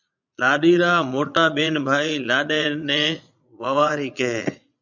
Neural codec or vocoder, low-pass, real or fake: vocoder, 22.05 kHz, 80 mel bands, Vocos; 7.2 kHz; fake